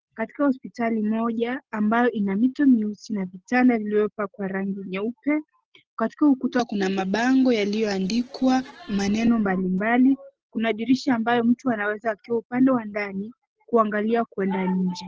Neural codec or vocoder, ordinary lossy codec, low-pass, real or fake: none; Opus, 16 kbps; 7.2 kHz; real